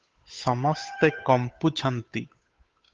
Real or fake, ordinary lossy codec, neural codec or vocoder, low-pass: fake; Opus, 32 kbps; codec, 16 kHz, 8 kbps, FunCodec, trained on Chinese and English, 25 frames a second; 7.2 kHz